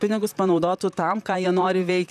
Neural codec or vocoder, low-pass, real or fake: vocoder, 44.1 kHz, 128 mel bands, Pupu-Vocoder; 14.4 kHz; fake